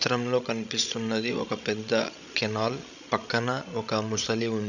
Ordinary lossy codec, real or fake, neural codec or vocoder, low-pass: none; fake; codec, 16 kHz, 16 kbps, FreqCodec, larger model; 7.2 kHz